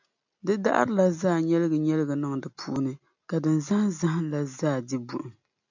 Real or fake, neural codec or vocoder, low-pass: real; none; 7.2 kHz